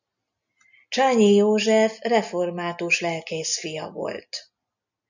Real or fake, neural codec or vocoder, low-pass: real; none; 7.2 kHz